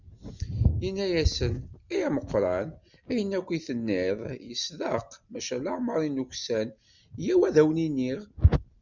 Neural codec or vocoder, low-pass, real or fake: none; 7.2 kHz; real